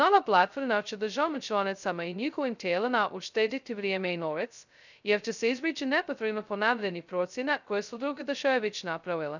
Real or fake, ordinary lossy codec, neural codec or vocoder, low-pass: fake; none; codec, 16 kHz, 0.2 kbps, FocalCodec; 7.2 kHz